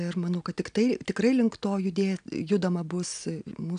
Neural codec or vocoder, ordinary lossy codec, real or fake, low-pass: none; AAC, 96 kbps; real; 9.9 kHz